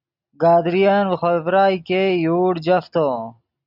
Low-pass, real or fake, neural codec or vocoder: 5.4 kHz; real; none